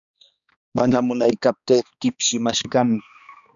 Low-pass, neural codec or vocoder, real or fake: 7.2 kHz; codec, 16 kHz, 2 kbps, X-Codec, HuBERT features, trained on balanced general audio; fake